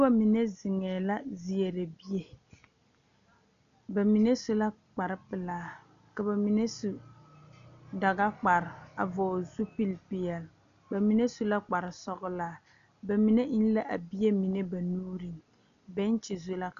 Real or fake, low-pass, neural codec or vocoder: real; 7.2 kHz; none